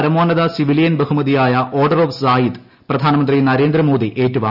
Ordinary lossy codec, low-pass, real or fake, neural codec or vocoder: none; 5.4 kHz; real; none